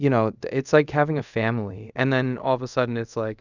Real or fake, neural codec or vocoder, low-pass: fake; codec, 24 kHz, 0.5 kbps, DualCodec; 7.2 kHz